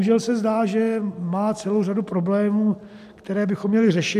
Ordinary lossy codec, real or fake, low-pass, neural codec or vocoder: MP3, 96 kbps; real; 14.4 kHz; none